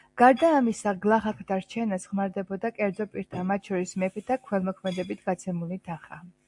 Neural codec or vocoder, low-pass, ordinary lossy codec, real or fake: none; 10.8 kHz; AAC, 64 kbps; real